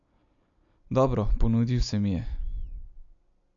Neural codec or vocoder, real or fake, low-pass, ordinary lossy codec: none; real; 7.2 kHz; none